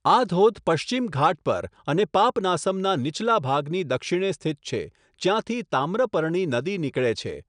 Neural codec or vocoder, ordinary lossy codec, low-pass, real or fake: none; none; 9.9 kHz; real